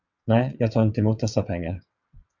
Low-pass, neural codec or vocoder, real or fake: 7.2 kHz; vocoder, 22.05 kHz, 80 mel bands, WaveNeXt; fake